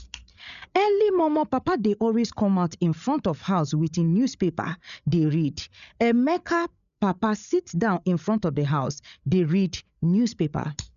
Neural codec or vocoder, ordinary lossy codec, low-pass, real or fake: codec, 16 kHz, 8 kbps, FreqCodec, larger model; none; 7.2 kHz; fake